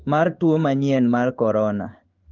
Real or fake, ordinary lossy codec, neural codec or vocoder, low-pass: fake; Opus, 32 kbps; codec, 16 kHz in and 24 kHz out, 1 kbps, XY-Tokenizer; 7.2 kHz